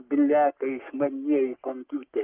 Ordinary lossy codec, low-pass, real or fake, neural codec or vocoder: Opus, 32 kbps; 3.6 kHz; fake; codec, 44.1 kHz, 3.4 kbps, Pupu-Codec